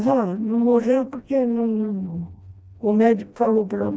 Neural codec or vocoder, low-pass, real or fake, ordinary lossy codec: codec, 16 kHz, 1 kbps, FreqCodec, smaller model; none; fake; none